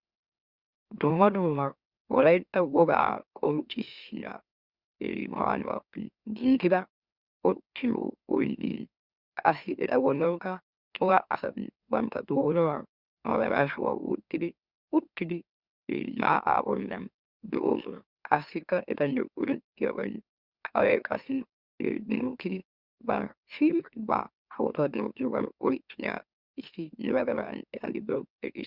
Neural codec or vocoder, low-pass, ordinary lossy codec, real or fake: autoencoder, 44.1 kHz, a latent of 192 numbers a frame, MeloTTS; 5.4 kHz; Opus, 64 kbps; fake